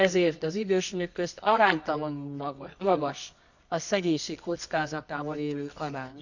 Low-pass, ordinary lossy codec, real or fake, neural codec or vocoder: 7.2 kHz; none; fake; codec, 24 kHz, 0.9 kbps, WavTokenizer, medium music audio release